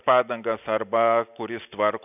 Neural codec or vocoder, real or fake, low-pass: none; real; 3.6 kHz